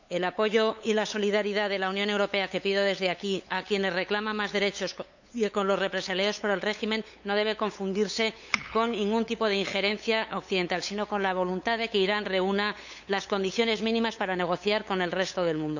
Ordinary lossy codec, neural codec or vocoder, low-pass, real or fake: none; codec, 16 kHz, 8 kbps, FunCodec, trained on LibriTTS, 25 frames a second; 7.2 kHz; fake